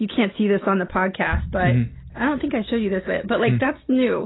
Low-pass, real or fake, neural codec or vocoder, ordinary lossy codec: 7.2 kHz; real; none; AAC, 16 kbps